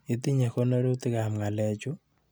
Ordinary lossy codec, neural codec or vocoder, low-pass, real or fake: none; none; none; real